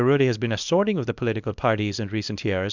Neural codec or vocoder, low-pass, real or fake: codec, 24 kHz, 0.9 kbps, WavTokenizer, small release; 7.2 kHz; fake